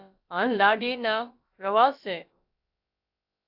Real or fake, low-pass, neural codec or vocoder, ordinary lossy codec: fake; 5.4 kHz; codec, 16 kHz, about 1 kbps, DyCAST, with the encoder's durations; AAC, 48 kbps